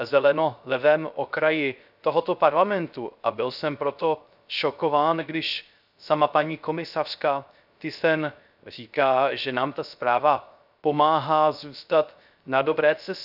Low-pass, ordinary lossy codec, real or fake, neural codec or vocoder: 5.4 kHz; none; fake; codec, 16 kHz, 0.3 kbps, FocalCodec